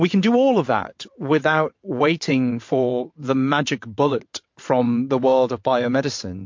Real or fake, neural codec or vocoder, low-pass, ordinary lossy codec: fake; vocoder, 22.05 kHz, 80 mel bands, WaveNeXt; 7.2 kHz; MP3, 48 kbps